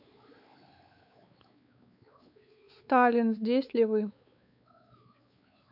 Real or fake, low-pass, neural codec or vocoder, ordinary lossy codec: fake; 5.4 kHz; codec, 16 kHz, 4 kbps, X-Codec, WavLM features, trained on Multilingual LibriSpeech; none